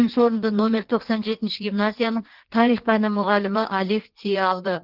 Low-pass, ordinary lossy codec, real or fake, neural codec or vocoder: 5.4 kHz; Opus, 16 kbps; fake; codec, 16 kHz in and 24 kHz out, 1.1 kbps, FireRedTTS-2 codec